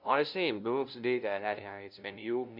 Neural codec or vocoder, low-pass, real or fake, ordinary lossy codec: codec, 16 kHz, 0.5 kbps, FunCodec, trained on LibriTTS, 25 frames a second; 5.4 kHz; fake; none